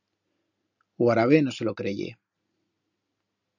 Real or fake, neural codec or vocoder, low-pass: real; none; 7.2 kHz